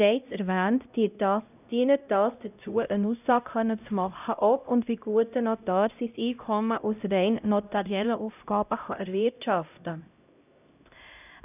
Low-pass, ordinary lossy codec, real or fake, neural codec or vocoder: 3.6 kHz; none; fake; codec, 16 kHz, 0.5 kbps, X-Codec, HuBERT features, trained on LibriSpeech